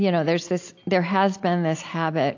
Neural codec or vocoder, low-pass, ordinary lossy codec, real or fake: none; 7.2 kHz; AAC, 48 kbps; real